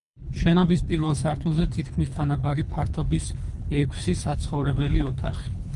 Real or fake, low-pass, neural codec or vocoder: fake; 10.8 kHz; codec, 24 kHz, 3 kbps, HILCodec